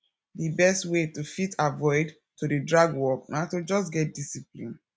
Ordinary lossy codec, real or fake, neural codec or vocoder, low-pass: none; real; none; none